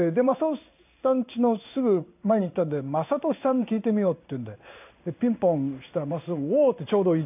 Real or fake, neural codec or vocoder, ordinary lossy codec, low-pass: fake; codec, 16 kHz in and 24 kHz out, 1 kbps, XY-Tokenizer; none; 3.6 kHz